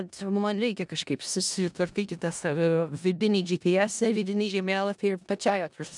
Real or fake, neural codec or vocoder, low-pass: fake; codec, 16 kHz in and 24 kHz out, 0.4 kbps, LongCat-Audio-Codec, four codebook decoder; 10.8 kHz